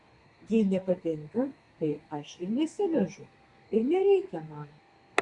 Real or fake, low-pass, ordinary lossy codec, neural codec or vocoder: fake; 10.8 kHz; Opus, 64 kbps; codec, 32 kHz, 1.9 kbps, SNAC